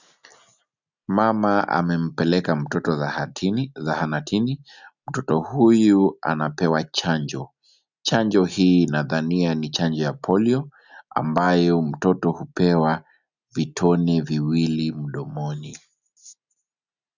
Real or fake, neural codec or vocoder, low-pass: real; none; 7.2 kHz